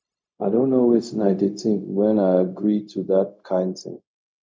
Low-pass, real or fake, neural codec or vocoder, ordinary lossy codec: none; fake; codec, 16 kHz, 0.4 kbps, LongCat-Audio-Codec; none